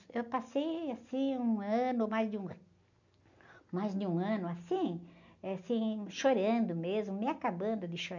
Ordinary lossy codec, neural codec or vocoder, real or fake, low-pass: none; none; real; 7.2 kHz